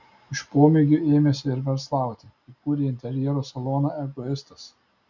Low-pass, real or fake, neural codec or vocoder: 7.2 kHz; real; none